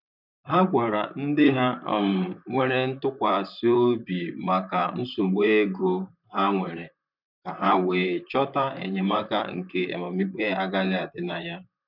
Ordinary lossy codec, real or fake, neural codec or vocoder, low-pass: none; fake; vocoder, 44.1 kHz, 128 mel bands, Pupu-Vocoder; 5.4 kHz